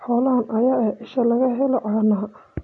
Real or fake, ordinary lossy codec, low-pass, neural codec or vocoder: real; MP3, 48 kbps; 10.8 kHz; none